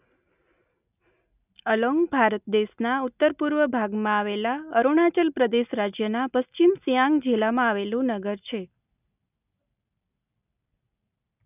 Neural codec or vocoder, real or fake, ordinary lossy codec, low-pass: none; real; none; 3.6 kHz